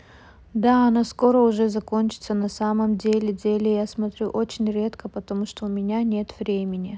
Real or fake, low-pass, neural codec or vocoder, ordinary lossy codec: real; none; none; none